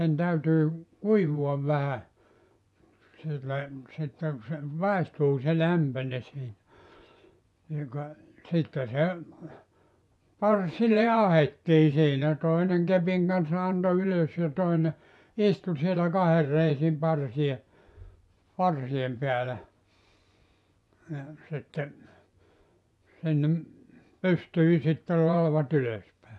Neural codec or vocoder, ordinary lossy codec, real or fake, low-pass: vocoder, 24 kHz, 100 mel bands, Vocos; none; fake; none